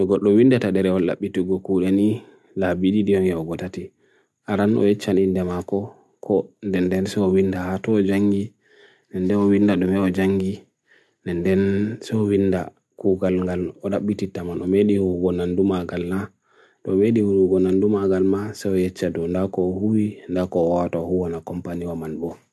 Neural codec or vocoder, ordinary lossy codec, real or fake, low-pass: none; none; real; none